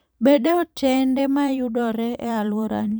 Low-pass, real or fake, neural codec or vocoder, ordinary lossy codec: none; fake; vocoder, 44.1 kHz, 128 mel bands every 512 samples, BigVGAN v2; none